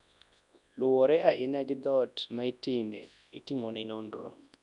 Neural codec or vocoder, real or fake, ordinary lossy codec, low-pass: codec, 24 kHz, 0.9 kbps, WavTokenizer, large speech release; fake; none; 10.8 kHz